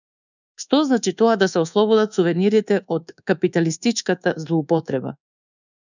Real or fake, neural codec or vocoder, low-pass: fake; codec, 24 kHz, 1.2 kbps, DualCodec; 7.2 kHz